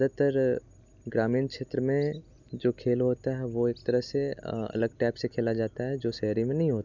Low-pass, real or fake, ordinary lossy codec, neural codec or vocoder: 7.2 kHz; real; none; none